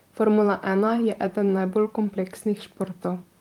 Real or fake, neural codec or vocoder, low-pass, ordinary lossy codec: fake; vocoder, 44.1 kHz, 128 mel bands, Pupu-Vocoder; 19.8 kHz; Opus, 32 kbps